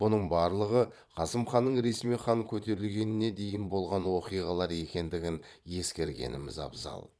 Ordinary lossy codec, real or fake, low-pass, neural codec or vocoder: none; fake; none; vocoder, 22.05 kHz, 80 mel bands, Vocos